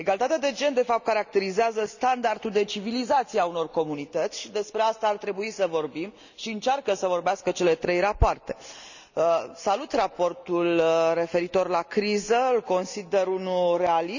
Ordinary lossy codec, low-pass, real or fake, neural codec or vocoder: none; 7.2 kHz; real; none